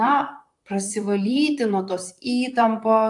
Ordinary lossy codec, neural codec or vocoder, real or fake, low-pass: AAC, 64 kbps; vocoder, 44.1 kHz, 128 mel bands, Pupu-Vocoder; fake; 10.8 kHz